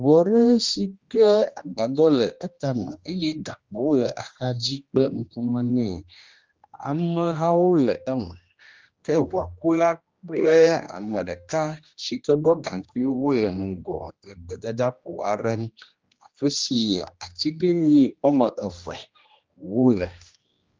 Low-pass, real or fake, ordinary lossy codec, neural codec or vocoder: 7.2 kHz; fake; Opus, 32 kbps; codec, 16 kHz, 1 kbps, X-Codec, HuBERT features, trained on general audio